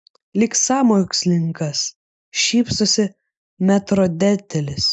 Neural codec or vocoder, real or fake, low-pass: none; real; 10.8 kHz